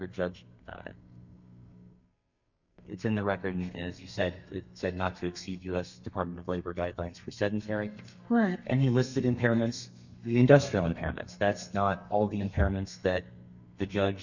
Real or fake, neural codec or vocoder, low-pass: fake; codec, 32 kHz, 1.9 kbps, SNAC; 7.2 kHz